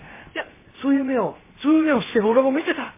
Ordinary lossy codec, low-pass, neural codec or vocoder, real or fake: MP3, 16 kbps; 3.6 kHz; codec, 16 kHz, 0.7 kbps, FocalCodec; fake